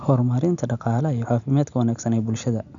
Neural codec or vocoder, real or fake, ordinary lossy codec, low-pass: none; real; none; 7.2 kHz